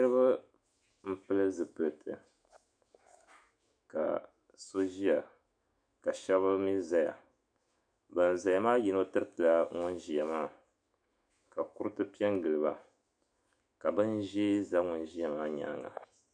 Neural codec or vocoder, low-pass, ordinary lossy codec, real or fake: autoencoder, 48 kHz, 128 numbers a frame, DAC-VAE, trained on Japanese speech; 9.9 kHz; MP3, 96 kbps; fake